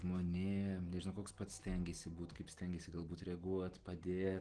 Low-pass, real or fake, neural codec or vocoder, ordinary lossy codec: 9.9 kHz; real; none; Opus, 16 kbps